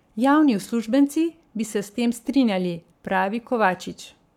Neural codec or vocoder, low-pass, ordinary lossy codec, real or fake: codec, 44.1 kHz, 7.8 kbps, Pupu-Codec; 19.8 kHz; none; fake